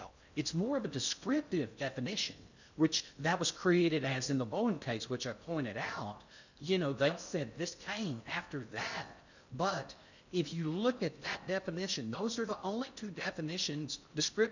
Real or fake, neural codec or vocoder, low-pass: fake; codec, 16 kHz in and 24 kHz out, 0.6 kbps, FocalCodec, streaming, 4096 codes; 7.2 kHz